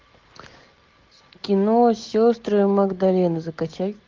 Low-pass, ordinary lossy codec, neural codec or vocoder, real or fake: 7.2 kHz; Opus, 24 kbps; none; real